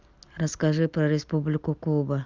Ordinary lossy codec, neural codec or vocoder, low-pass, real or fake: Opus, 32 kbps; none; 7.2 kHz; real